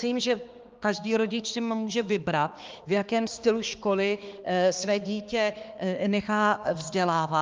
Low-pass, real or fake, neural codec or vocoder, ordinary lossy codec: 7.2 kHz; fake; codec, 16 kHz, 2 kbps, X-Codec, HuBERT features, trained on balanced general audio; Opus, 24 kbps